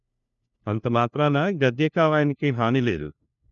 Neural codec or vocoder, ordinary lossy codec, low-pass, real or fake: codec, 16 kHz, 1 kbps, FunCodec, trained on LibriTTS, 50 frames a second; MP3, 96 kbps; 7.2 kHz; fake